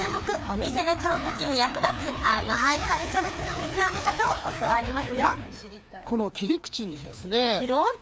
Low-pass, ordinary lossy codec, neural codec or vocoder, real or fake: none; none; codec, 16 kHz, 2 kbps, FreqCodec, larger model; fake